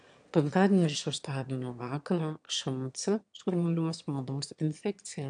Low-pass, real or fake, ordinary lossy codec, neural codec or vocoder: 9.9 kHz; fake; AAC, 64 kbps; autoencoder, 22.05 kHz, a latent of 192 numbers a frame, VITS, trained on one speaker